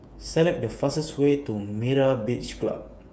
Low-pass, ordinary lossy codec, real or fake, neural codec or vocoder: none; none; fake; codec, 16 kHz, 8 kbps, FreqCodec, smaller model